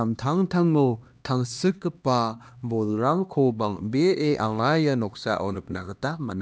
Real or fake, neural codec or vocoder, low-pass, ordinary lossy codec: fake; codec, 16 kHz, 1 kbps, X-Codec, HuBERT features, trained on LibriSpeech; none; none